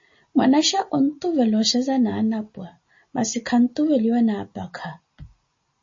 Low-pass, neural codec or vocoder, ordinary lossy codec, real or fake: 7.2 kHz; none; MP3, 32 kbps; real